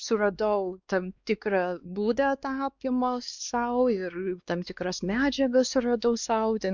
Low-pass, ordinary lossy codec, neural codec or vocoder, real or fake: 7.2 kHz; Opus, 64 kbps; codec, 16 kHz, 2 kbps, X-Codec, WavLM features, trained on Multilingual LibriSpeech; fake